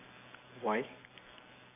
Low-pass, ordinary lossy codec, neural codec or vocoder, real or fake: 3.6 kHz; none; vocoder, 44.1 kHz, 128 mel bands every 256 samples, BigVGAN v2; fake